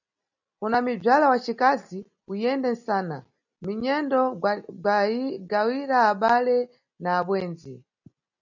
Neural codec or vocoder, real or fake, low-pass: none; real; 7.2 kHz